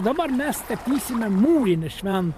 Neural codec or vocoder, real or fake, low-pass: vocoder, 44.1 kHz, 128 mel bands every 512 samples, BigVGAN v2; fake; 14.4 kHz